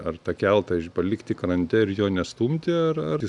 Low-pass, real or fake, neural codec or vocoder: 10.8 kHz; real; none